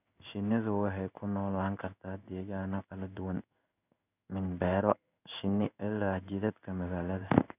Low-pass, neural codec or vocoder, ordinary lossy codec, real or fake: 3.6 kHz; codec, 16 kHz in and 24 kHz out, 1 kbps, XY-Tokenizer; none; fake